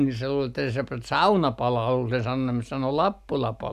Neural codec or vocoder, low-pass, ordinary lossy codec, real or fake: none; 14.4 kHz; none; real